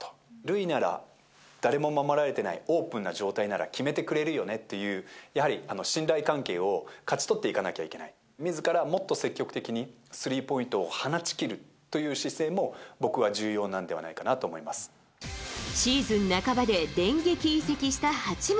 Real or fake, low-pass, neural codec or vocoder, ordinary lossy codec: real; none; none; none